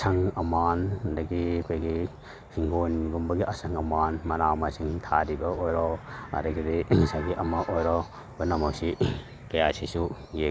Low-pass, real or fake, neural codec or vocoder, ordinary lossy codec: none; real; none; none